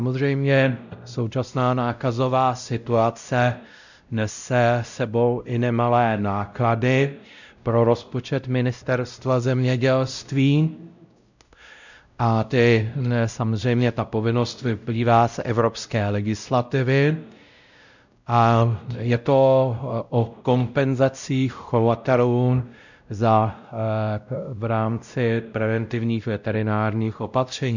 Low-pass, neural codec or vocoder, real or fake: 7.2 kHz; codec, 16 kHz, 0.5 kbps, X-Codec, WavLM features, trained on Multilingual LibriSpeech; fake